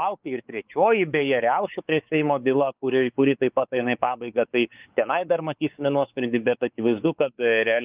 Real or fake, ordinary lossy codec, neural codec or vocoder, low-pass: fake; Opus, 24 kbps; codec, 16 kHz, 4 kbps, X-Codec, WavLM features, trained on Multilingual LibriSpeech; 3.6 kHz